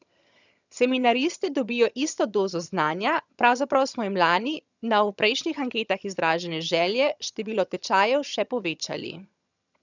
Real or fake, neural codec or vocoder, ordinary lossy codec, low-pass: fake; vocoder, 22.05 kHz, 80 mel bands, HiFi-GAN; none; 7.2 kHz